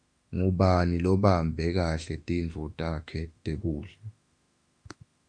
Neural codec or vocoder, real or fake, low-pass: autoencoder, 48 kHz, 32 numbers a frame, DAC-VAE, trained on Japanese speech; fake; 9.9 kHz